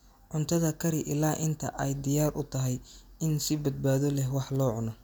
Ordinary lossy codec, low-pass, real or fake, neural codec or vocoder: none; none; real; none